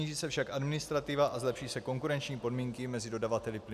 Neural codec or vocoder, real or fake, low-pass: none; real; 14.4 kHz